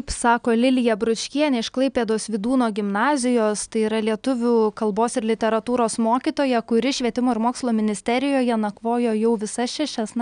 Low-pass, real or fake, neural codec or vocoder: 9.9 kHz; real; none